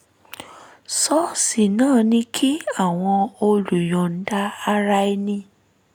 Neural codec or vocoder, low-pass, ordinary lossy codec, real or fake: none; none; none; real